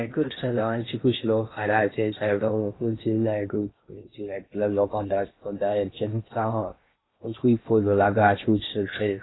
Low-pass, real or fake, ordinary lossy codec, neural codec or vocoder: 7.2 kHz; fake; AAC, 16 kbps; codec, 16 kHz in and 24 kHz out, 0.6 kbps, FocalCodec, streaming, 2048 codes